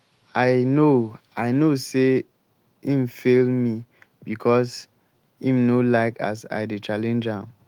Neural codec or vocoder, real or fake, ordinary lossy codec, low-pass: autoencoder, 48 kHz, 128 numbers a frame, DAC-VAE, trained on Japanese speech; fake; Opus, 24 kbps; 19.8 kHz